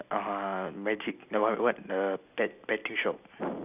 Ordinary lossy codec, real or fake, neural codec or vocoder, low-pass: none; real; none; 3.6 kHz